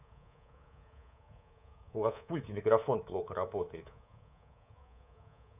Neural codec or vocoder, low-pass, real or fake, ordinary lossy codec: codec, 24 kHz, 3.1 kbps, DualCodec; 3.6 kHz; fake; none